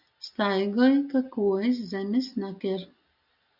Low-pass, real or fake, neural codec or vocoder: 5.4 kHz; real; none